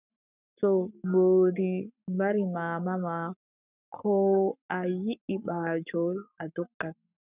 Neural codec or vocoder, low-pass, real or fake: codec, 44.1 kHz, 7.8 kbps, Pupu-Codec; 3.6 kHz; fake